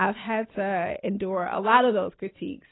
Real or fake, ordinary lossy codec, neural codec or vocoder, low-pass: real; AAC, 16 kbps; none; 7.2 kHz